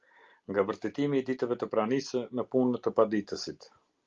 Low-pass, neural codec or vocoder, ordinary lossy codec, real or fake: 7.2 kHz; none; Opus, 32 kbps; real